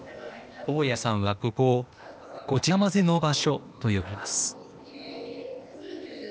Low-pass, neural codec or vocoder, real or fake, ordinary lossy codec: none; codec, 16 kHz, 0.8 kbps, ZipCodec; fake; none